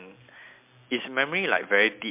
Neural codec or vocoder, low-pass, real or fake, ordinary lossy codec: none; 3.6 kHz; real; MP3, 32 kbps